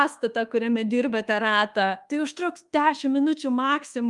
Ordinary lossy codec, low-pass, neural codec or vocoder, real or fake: Opus, 32 kbps; 10.8 kHz; codec, 24 kHz, 1.2 kbps, DualCodec; fake